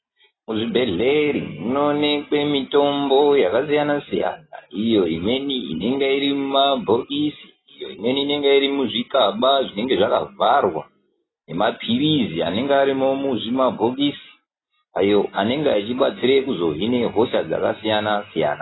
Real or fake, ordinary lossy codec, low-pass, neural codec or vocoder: real; AAC, 16 kbps; 7.2 kHz; none